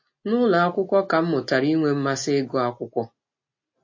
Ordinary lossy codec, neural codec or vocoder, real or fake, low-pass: MP3, 32 kbps; none; real; 7.2 kHz